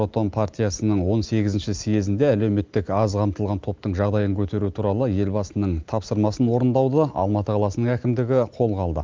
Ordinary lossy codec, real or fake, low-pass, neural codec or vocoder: Opus, 24 kbps; real; 7.2 kHz; none